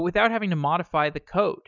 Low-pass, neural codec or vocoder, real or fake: 7.2 kHz; none; real